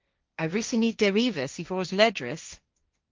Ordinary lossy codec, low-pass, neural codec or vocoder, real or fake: Opus, 24 kbps; 7.2 kHz; codec, 16 kHz, 1.1 kbps, Voila-Tokenizer; fake